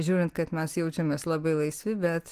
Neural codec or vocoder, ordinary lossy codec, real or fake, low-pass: none; Opus, 24 kbps; real; 14.4 kHz